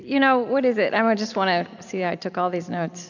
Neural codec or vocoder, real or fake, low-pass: none; real; 7.2 kHz